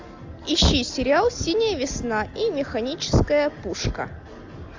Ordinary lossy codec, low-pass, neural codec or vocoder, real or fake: AAC, 48 kbps; 7.2 kHz; none; real